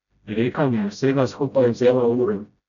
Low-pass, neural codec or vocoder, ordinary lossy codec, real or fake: 7.2 kHz; codec, 16 kHz, 0.5 kbps, FreqCodec, smaller model; none; fake